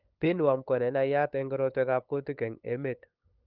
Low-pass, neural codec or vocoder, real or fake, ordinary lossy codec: 5.4 kHz; codec, 16 kHz, 8 kbps, FunCodec, trained on LibriTTS, 25 frames a second; fake; Opus, 24 kbps